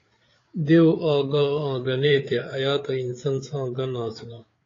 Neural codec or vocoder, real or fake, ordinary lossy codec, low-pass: codec, 16 kHz, 8 kbps, FreqCodec, larger model; fake; AAC, 32 kbps; 7.2 kHz